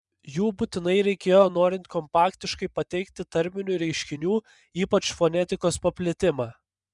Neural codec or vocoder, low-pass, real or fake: vocoder, 24 kHz, 100 mel bands, Vocos; 10.8 kHz; fake